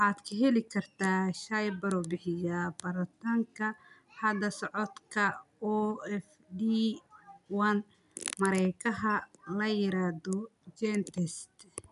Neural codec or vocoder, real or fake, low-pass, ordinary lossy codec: none; real; 10.8 kHz; none